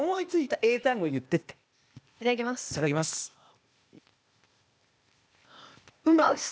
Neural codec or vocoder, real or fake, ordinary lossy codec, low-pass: codec, 16 kHz, 0.8 kbps, ZipCodec; fake; none; none